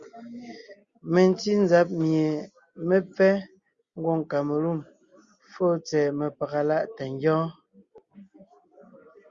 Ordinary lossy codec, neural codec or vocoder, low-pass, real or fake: Opus, 64 kbps; none; 7.2 kHz; real